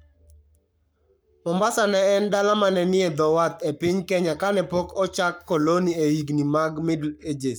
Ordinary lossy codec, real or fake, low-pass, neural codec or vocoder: none; fake; none; codec, 44.1 kHz, 7.8 kbps, Pupu-Codec